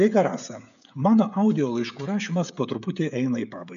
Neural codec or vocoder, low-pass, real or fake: codec, 16 kHz, 16 kbps, FreqCodec, smaller model; 7.2 kHz; fake